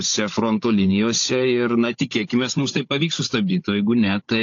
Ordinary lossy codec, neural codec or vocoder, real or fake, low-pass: AAC, 48 kbps; codec, 16 kHz, 16 kbps, FunCodec, trained on Chinese and English, 50 frames a second; fake; 7.2 kHz